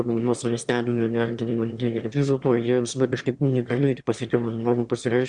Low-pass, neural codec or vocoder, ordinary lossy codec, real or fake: 9.9 kHz; autoencoder, 22.05 kHz, a latent of 192 numbers a frame, VITS, trained on one speaker; Opus, 64 kbps; fake